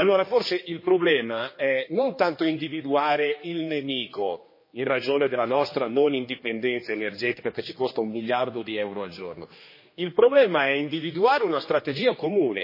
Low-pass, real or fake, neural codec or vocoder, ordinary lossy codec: 5.4 kHz; fake; codec, 16 kHz, 2 kbps, X-Codec, HuBERT features, trained on general audio; MP3, 24 kbps